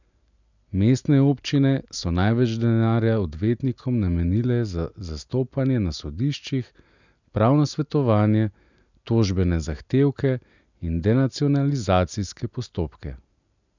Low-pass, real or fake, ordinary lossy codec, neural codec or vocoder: 7.2 kHz; real; none; none